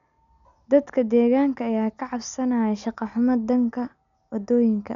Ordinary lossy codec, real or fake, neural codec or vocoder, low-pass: none; real; none; 7.2 kHz